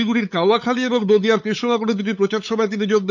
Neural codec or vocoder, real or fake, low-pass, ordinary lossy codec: codec, 16 kHz, 8 kbps, FunCodec, trained on LibriTTS, 25 frames a second; fake; 7.2 kHz; none